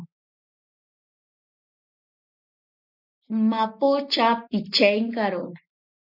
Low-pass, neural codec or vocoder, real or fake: 5.4 kHz; none; real